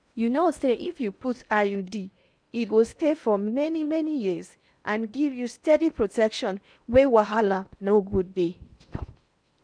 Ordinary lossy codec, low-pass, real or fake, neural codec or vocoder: none; 9.9 kHz; fake; codec, 16 kHz in and 24 kHz out, 0.8 kbps, FocalCodec, streaming, 65536 codes